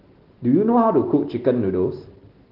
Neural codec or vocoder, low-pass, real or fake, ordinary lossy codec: none; 5.4 kHz; real; Opus, 16 kbps